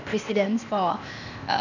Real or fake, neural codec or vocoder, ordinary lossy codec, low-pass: fake; codec, 16 kHz, 0.8 kbps, ZipCodec; none; 7.2 kHz